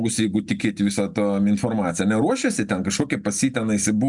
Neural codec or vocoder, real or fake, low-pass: none; real; 10.8 kHz